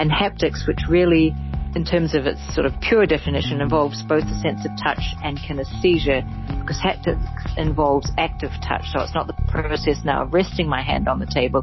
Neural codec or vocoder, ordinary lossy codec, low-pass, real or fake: none; MP3, 24 kbps; 7.2 kHz; real